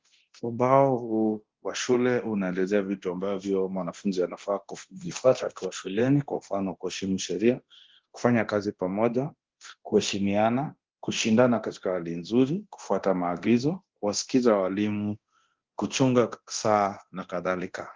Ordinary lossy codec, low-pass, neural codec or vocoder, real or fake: Opus, 16 kbps; 7.2 kHz; codec, 24 kHz, 0.9 kbps, DualCodec; fake